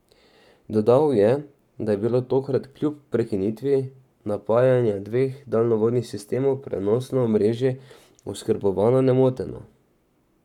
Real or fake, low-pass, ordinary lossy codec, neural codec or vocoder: fake; 19.8 kHz; none; codec, 44.1 kHz, 7.8 kbps, Pupu-Codec